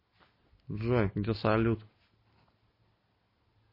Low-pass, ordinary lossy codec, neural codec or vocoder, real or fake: 5.4 kHz; MP3, 24 kbps; none; real